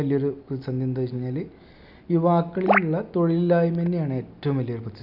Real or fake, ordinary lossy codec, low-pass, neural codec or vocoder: real; none; 5.4 kHz; none